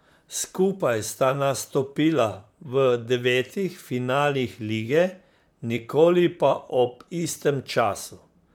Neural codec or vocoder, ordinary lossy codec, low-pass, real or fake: vocoder, 44.1 kHz, 128 mel bands, Pupu-Vocoder; MP3, 96 kbps; 19.8 kHz; fake